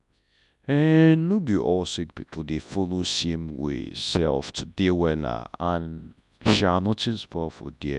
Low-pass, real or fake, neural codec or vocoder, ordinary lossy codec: 10.8 kHz; fake; codec, 24 kHz, 0.9 kbps, WavTokenizer, large speech release; none